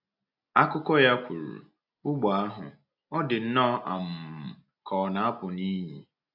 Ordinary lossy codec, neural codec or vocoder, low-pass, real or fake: AAC, 48 kbps; none; 5.4 kHz; real